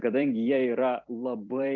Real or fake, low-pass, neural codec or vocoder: fake; 7.2 kHz; vocoder, 44.1 kHz, 128 mel bands every 256 samples, BigVGAN v2